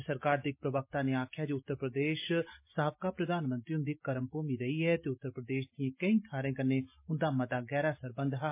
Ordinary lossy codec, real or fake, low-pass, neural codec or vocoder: MP3, 24 kbps; real; 3.6 kHz; none